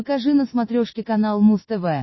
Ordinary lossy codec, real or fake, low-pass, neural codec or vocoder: MP3, 24 kbps; real; 7.2 kHz; none